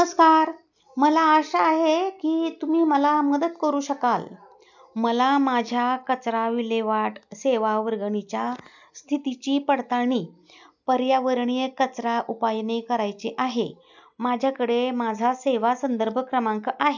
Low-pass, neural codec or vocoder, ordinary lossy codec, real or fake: 7.2 kHz; none; none; real